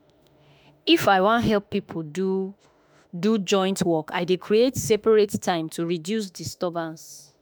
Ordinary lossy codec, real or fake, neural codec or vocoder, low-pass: none; fake; autoencoder, 48 kHz, 32 numbers a frame, DAC-VAE, trained on Japanese speech; none